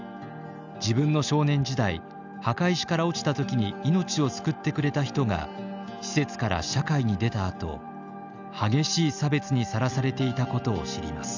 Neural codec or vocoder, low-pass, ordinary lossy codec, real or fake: none; 7.2 kHz; none; real